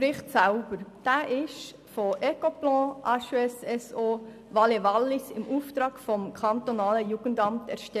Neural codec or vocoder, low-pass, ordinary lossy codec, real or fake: none; 14.4 kHz; none; real